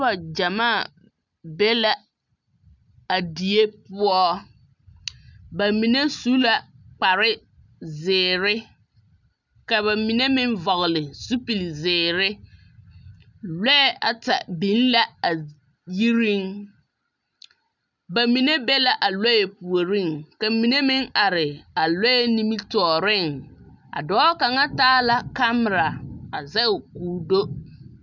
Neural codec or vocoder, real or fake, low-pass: none; real; 7.2 kHz